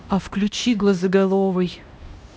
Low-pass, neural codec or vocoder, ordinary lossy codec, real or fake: none; codec, 16 kHz, 1 kbps, X-Codec, HuBERT features, trained on LibriSpeech; none; fake